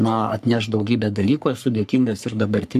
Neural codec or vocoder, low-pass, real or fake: codec, 44.1 kHz, 3.4 kbps, Pupu-Codec; 14.4 kHz; fake